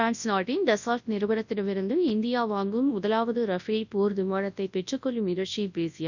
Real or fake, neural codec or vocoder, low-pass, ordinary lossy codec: fake; codec, 24 kHz, 0.9 kbps, WavTokenizer, large speech release; 7.2 kHz; none